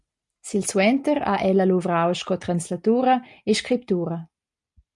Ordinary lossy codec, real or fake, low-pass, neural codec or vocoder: MP3, 64 kbps; real; 10.8 kHz; none